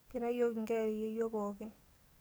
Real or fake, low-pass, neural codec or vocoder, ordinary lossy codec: fake; none; codec, 44.1 kHz, 7.8 kbps, DAC; none